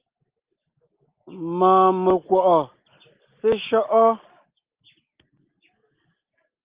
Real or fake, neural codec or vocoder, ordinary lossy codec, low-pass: real; none; Opus, 24 kbps; 3.6 kHz